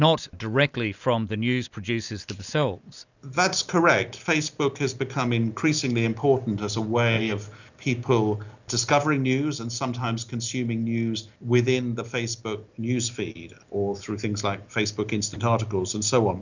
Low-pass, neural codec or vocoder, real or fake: 7.2 kHz; none; real